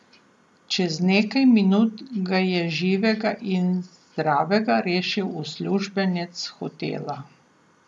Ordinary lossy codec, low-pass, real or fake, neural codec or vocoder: none; none; real; none